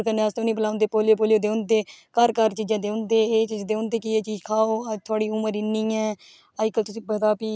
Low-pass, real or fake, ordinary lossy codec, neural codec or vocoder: none; real; none; none